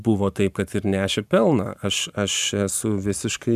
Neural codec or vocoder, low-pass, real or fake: vocoder, 44.1 kHz, 128 mel bands every 512 samples, BigVGAN v2; 14.4 kHz; fake